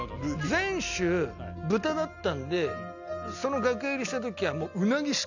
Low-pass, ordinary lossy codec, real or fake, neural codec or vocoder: 7.2 kHz; none; real; none